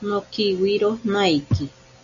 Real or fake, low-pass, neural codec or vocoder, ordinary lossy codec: real; 7.2 kHz; none; MP3, 96 kbps